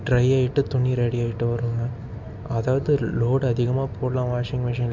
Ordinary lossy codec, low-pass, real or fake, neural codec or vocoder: MP3, 64 kbps; 7.2 kHz; real; none